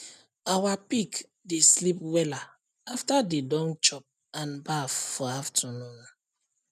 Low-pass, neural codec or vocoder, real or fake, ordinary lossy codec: 14.4 kHz; none; real; none